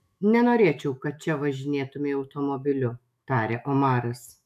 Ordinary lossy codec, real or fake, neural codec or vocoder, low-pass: AAC, 96 kbps; fake; autoencoder, 48 kHz, 128 numbers a frame, DAC-VAE, trained on Japanese speech; 14.4 kHz